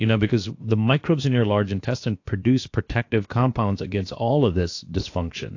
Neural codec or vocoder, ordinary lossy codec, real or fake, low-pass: codec, 16 kHz, about 1 kbps, DyCAST, with the encoder's durations; AAC, 48 kbps; fake; 7.2 kHz